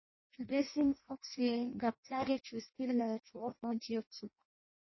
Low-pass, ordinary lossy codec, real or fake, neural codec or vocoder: 7.2 kHz; MP3, 24 kbps; fake; codec, 16 kHz in and 24 kHz out, 0.6 kbps, FireRedTTS-2 codec